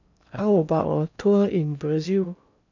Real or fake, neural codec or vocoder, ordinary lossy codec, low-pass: fake; codec, 16 kHz in and 24 kHz out, 0.8 kbps, FocalCodec, streaming, 65536 codes; none; 7.2 kHz